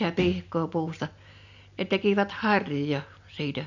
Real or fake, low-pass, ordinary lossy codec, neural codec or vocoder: real; 7.2 kHz; none; none